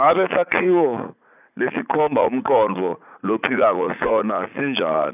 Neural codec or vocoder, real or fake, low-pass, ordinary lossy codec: vocoder, 44.1 kHz, 80 mel bands, Vocos; fake; 3.6 kHz; none